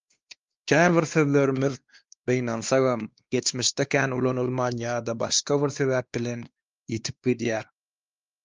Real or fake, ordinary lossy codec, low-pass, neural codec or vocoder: fake; Opus, 32 kbps; 7.2 kHz; codec, 16 kHz, 2 kbps, X-Codec, WavLM features, trained on Multilingual LibriSpeech